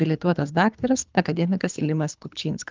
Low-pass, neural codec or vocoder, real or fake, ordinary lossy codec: 7.2 kHz; codec, 24 kHz, 3 kbps, HILCodec; fake; Opus, 24 kbps